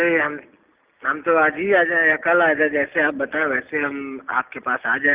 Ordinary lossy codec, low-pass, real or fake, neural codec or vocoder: Opus, 24 kbps; 3.6 kHz; real; none